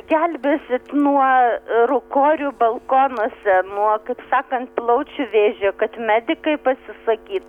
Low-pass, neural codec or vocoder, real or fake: 19.8 kHz; none; real